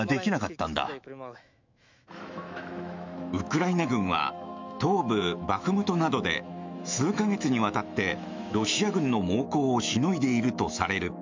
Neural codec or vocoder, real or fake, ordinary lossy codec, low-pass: autoencoder, 48 kHz, 128 numbers a frame, DAC-VAE, trained on Japanese speech; fake; MP3, 64 kbps; 7.2 kHz